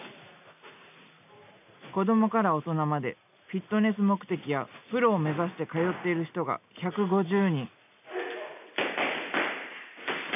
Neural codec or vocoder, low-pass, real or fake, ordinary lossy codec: none; 3.6 kHz; real; none